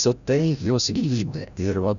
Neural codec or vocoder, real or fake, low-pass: codec, 16 kHz, 0.5 kbps, FreqCodec, larger model; fake; 7.2 kHz